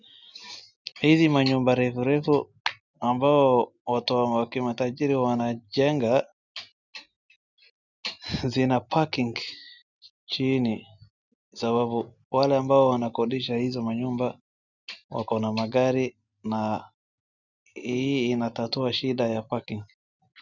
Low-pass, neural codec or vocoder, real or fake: 7.2 kHz; none; real